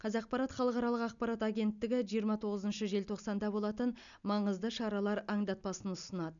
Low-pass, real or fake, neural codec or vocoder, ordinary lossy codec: 7.2 kHz; real; none; none